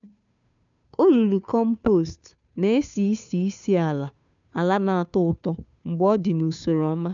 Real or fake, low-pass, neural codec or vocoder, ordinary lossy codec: fake; 7.2 kHz; codec, 16 kHz, 2 kbps, FunCodec, trained on Chinese and English, 25 frames a second; MP3, 96 kbps